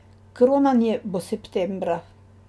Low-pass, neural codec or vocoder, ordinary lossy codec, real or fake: none; none; none; real